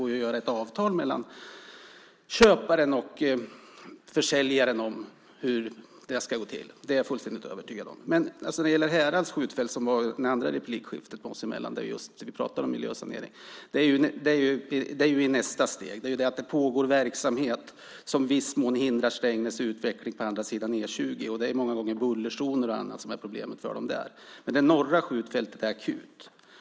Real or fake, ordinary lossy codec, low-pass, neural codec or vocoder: real; none; none; none